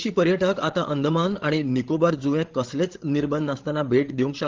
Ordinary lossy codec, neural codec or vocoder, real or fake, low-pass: Opus, 16 kbps; codec, 16 kHz, 16 kbps, FreqCodec, larger model; fake; 7.2 kHz